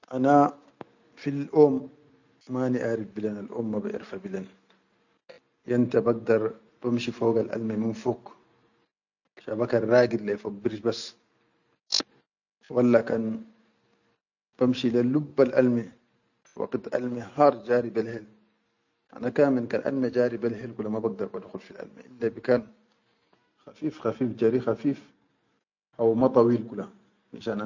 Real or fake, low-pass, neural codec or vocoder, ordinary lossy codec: real; 7.2 kHz; none; none